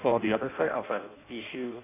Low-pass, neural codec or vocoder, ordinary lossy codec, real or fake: 3.6 kHz; codec, 16 kHz in and 24 kHz out, 0.6 kbps, FireRedTTS-2 codec; AAC, 24 kbps; fake